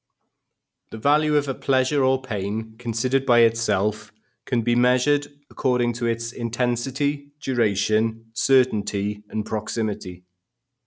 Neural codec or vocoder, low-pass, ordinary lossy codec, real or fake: none; none; none; real